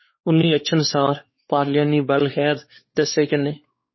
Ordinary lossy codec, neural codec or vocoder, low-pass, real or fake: MP3, 24 kbps; codec, 16 kHz, 4 kbps, X-Codec, WavLM features, trained on Multilingual LibriSpeech; 7.2 kHz; fake